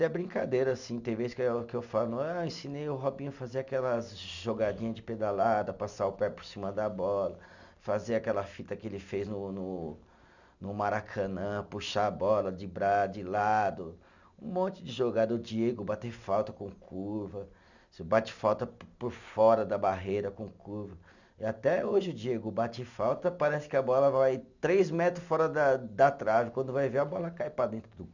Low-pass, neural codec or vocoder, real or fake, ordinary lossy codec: 7.2 kHz; none; real; none